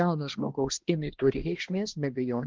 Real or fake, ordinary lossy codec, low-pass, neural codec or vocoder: fake; Opus, 16 kbps; 7.2 kHz; codec, 16 kHz, 1 kbps, X-Codec, HuBERT features, trained on balanced general audio